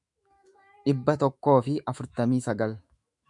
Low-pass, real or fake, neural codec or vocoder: 10.8 kHz; fake; autoencoder, 48 kHz, 128 numbers a frame, DAC-VAE, trained on Japanese speech